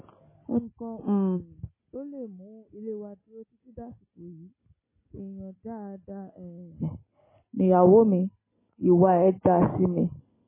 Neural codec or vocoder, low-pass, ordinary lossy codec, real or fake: none; 3.6 kHz; MP3, 16 kbps; real